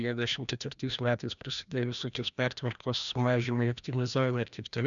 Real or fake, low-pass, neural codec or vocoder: fake; 7.2 kHz; codec, 16 kHz, 1 kbps, FreqCodec, larger model